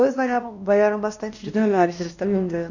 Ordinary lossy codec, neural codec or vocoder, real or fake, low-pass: none; codec, 16 kHz, 1 kbps, X-Codec, WavLM features, trained on Multilingual LibriSpeech; fake; 7.2 kHz